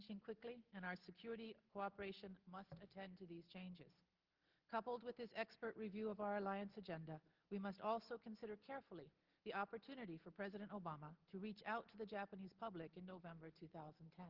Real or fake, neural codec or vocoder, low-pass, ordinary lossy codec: real; none; 5.4 kHz; Opus, 16 kbps